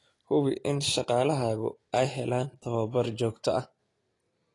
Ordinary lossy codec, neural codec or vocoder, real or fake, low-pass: AAC, 32 kbps; none; real; 10.8 kHz